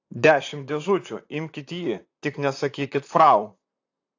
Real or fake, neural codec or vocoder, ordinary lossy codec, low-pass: fake; vocoder, 44.1 kHz, 128 mel bands, Pupu-Vocoder; AAC, 48 kbps; 7.2 kHz